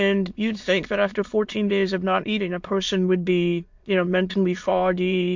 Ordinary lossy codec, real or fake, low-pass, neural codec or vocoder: MP3, 48 kbps; fake; 7.2 kHz; autoencoder, 22.05 kHz, a latent of 192 numbers a frame, VITS, trained on many speakers